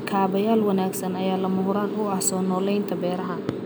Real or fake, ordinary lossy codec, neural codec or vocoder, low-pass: real; none; none; none